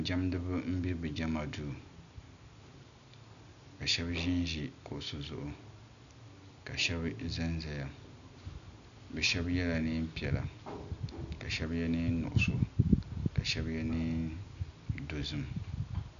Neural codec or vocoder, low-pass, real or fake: none; 7.2 kHz; real